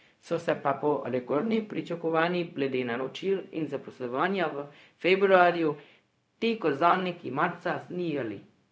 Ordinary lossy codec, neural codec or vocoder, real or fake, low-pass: none; codec, 16 kHz, 0.4 kbps, LongCat-Audio-Codec; fake; none